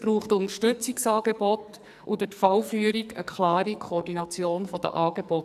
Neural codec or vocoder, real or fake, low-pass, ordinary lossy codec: codec, 44.1 kHz, 2.6 kbps, SNAC; fake; 14.4 kHz; none